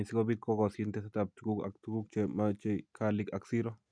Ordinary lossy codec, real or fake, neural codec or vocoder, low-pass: none; real; none; 9.9 kHz